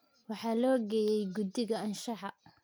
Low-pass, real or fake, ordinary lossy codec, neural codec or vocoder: none; real; none; none